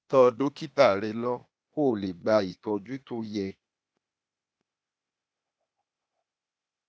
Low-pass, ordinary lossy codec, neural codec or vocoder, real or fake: none; none; codec, 16 kHz, 0.8 kbps, ZipCodec; fake